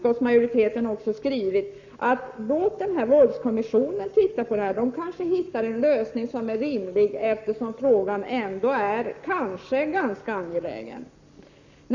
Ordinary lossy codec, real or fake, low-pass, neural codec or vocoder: none; fake; 7.2 kHz; codec, 44.1 kHz, 7.8 kbps, Pupu-Codec